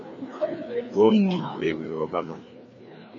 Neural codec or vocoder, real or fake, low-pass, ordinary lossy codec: codec, 16 kHz, 2 kbps, FreqCodec, larger model; fake; 7.2 kHz; MP3, 32 kbps